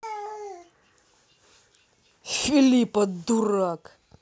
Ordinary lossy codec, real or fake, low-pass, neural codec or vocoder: none; real; none; none